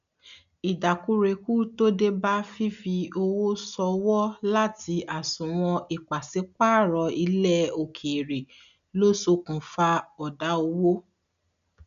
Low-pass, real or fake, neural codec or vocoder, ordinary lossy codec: 7.2 kHz; real; none; none